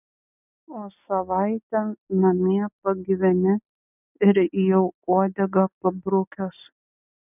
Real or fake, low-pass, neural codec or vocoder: real; 3.6 kHz; none